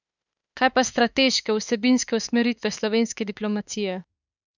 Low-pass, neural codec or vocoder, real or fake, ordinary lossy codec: 7.2 kHz; autoencoder, 48 kHz, 32 numbers a frame, DAC-VAE, trained on Japanese speech; fake; none